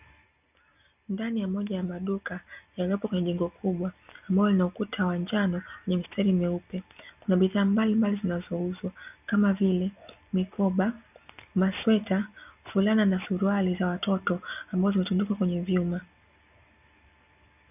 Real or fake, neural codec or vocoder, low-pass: real; none; 3.6 kHz